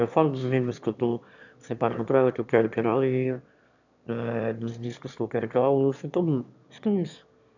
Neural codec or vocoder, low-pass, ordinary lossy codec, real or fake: autoencoder, 22.05 kHz, a latent of 192 numbers a frame, VITS, trained on one speaker; 7.2 kHz; none; fake